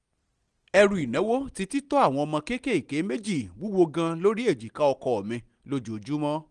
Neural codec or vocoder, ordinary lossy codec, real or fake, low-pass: none; Opus, 24 kbps; real; 9.9 kHz